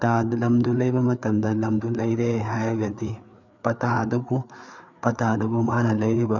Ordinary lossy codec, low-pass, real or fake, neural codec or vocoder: none; 7.2 kHz; fake; codec, 16 kHz, 4 kbps, FreqCodec, larger model